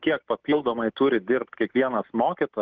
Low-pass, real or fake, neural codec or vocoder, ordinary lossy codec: 7.2 kHz; real; none; Opus, 24 kbps